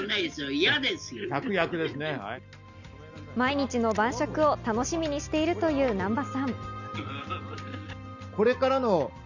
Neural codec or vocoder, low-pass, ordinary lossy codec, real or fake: none; 7.2 kHz; none; real